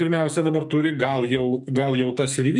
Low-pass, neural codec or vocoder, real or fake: 10.8 kHz; codec, 44.1 kHz, 2.6 kbps, SNAC; fake